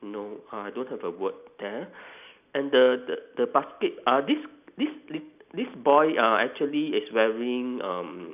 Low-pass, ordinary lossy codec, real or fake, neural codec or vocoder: 3.6 kHz; none; real; none